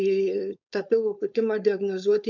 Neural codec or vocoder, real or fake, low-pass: codec, 16 kHz, 4.8 kbps, FACodec; fake; 7.2 kHz